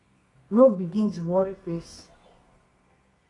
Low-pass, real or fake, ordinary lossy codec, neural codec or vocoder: 10.8 kHz; fake; AAC, 32 kbps; codec, 24 kHz, 0.9 kbps, WavTokenizer, medium music audio release